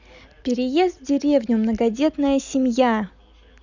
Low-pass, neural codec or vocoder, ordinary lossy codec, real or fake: 7.2 kHz; none; none; real